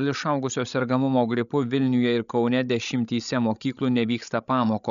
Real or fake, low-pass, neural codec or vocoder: fake; 7.2 kHz; codec, 16 kHz, 16 kbps, FreqCodec, larger model